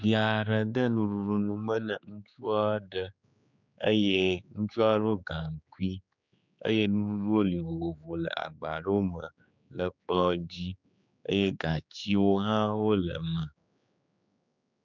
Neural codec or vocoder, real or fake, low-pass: codec, 16 kHz, 4 kbps, X-Codec, HuBERT features, trained on general audio; fake; 7.2 kHz